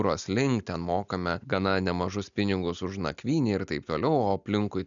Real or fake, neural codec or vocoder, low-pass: real; none; 7.2 kHz